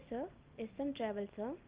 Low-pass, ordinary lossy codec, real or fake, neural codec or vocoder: 3.6 kHz; Opus, 32 kbps; real; none